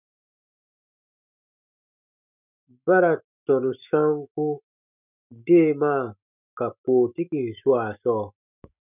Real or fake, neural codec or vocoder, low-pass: fake; autoencoder, 48 kHz, 128 numbers a frame, DAC-VAE, trained on Japanese speech; 3.6 kHz